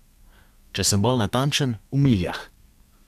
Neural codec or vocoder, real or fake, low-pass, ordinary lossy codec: codec, 32 kHz, 1.9 kbps, SNAC; fake; 14.4 kHz; none